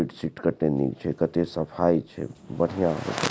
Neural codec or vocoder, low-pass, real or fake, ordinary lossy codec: none; none; real; none